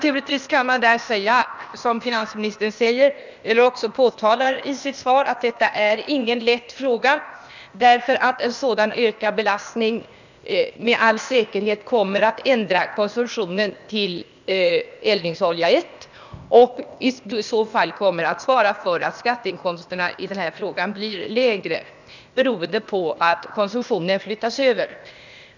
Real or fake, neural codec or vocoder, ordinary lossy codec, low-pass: fake; codec, 16 kHz, 0.8 kbps, ZipCodec; none; 7.2 kHz